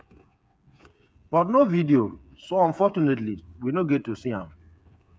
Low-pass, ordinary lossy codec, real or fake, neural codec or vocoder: none; none; fake; codec, 16 kHz, 8 kbps, FreqCodec, smaller model